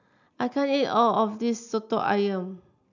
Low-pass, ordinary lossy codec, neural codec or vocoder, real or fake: 7.2 kHz; none; none; real